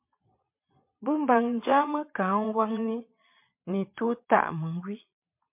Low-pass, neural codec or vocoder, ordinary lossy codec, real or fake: 3.6 kHz; vocoder, 22.05 kHz, 80 mel bands, Vocos; MP3, 32 kbps; fake